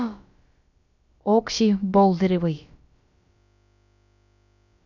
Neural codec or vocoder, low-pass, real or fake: codec, 16 kHz, about 1 kbps, DyCAST, with the encoder's durations; 7.2 kHz; fake